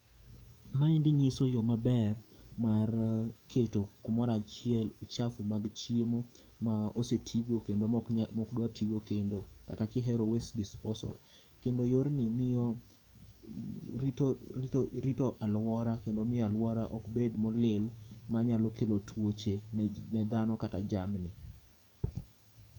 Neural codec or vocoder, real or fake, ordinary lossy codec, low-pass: codec, 44.1 kHz, 7.8 kbps, DAC; fake; none; 19.8 kHz